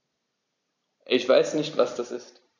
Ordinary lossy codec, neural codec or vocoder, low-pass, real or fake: none; none; 7.2 kHz; real